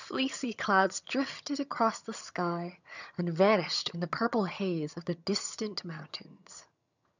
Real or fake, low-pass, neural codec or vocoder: fake; 7.2 kHz; vocoder, 22.05 kHz, 80 mel bands, HiFi-GAN